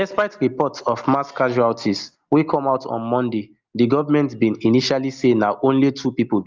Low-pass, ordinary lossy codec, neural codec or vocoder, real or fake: 7.2 kHz; Opus, 32 kbps; none; real